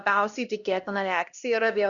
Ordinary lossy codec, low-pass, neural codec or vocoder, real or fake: Opus, 64 kbps; 7.2 kHz; codec, 16 kHz, 1 kbps, X-Codec, HuBERT features, trained on LibriSpeech; fake